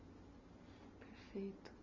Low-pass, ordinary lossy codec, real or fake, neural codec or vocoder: 7.2 kHz; MP3, 48 kbps; real; none